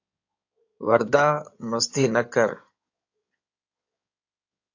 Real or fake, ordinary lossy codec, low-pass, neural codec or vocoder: fake; AAC, 48 kbps; 7.2 kHz; codec, 16 kHz in and 24 kHz out, 2.2 kbps, FireRedTTS-2 codec